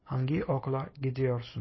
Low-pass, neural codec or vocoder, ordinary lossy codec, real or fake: 7.2 kHz; none; MP3, 24 kbps; real